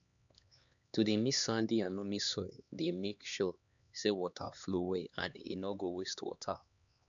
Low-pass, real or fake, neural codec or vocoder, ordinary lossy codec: 7.2 kHz; fake; codec, 16 kHz, 2 kbps, X-Codec, HuBERT features, trained on LibriSpeech; none